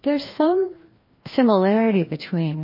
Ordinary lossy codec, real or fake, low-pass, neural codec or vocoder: MP3, 24 kbps; fake; 5.4 kHz; codec, 16 kHz, 1 kbps, FreqCodec, larger model